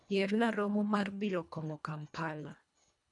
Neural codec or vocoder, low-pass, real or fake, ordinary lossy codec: codec, 24 kHz, 1.5 kbps, HILCodec; 10.8 kHz; fake; none